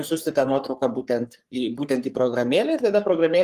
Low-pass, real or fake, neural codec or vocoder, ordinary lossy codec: 14.4 kHz; fake; codec, 44.1 kHz, 3.4 kbps, Pupu-Codec; Opus, 24 kbps